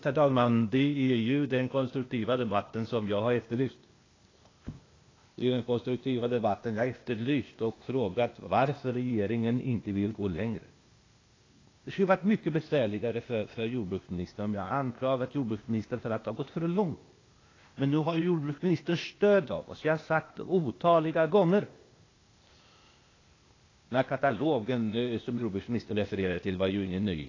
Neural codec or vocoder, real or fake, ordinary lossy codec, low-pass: codec, 16 kHz, 0.8 kbps, ZipCodec; fake; AAC, 32 kbps; 7.2 kHz